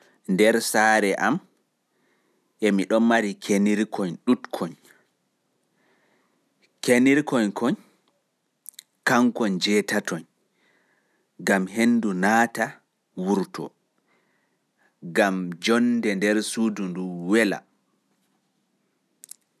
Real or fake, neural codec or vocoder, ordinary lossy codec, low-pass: real; none; none; 14.4 kHz